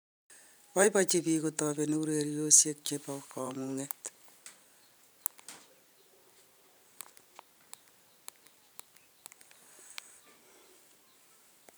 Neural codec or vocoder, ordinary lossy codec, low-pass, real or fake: vocoder, 44.1 kHz, 128 mel bands every 512 samples, BigVGAN v2; none; none; fake